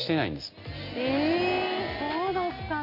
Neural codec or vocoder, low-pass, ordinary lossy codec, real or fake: none; 5.4 kHz; MP3, 32 kbps; real